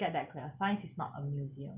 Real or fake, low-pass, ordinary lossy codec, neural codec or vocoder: real; 3.6 kHz; Opus, 64 kbps; none